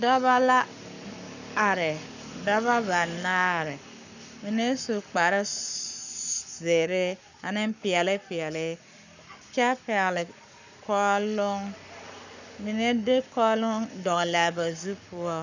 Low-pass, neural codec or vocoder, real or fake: 7.2 kHz; codec, 44.1 kHz, 7.8 kbps, Pupu-Codec; fake